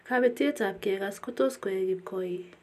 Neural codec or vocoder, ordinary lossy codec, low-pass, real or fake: none; none; 14.4 kHz; real